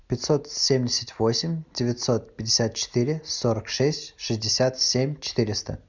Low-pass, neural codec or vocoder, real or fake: 7.2 kHz; none; real